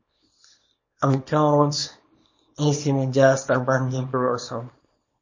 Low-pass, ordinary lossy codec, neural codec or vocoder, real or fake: 7.2 kHz; MP3, 32 kbps; codec, 24 kHz, 0.9 kbps, WavTokenizer, small release; fake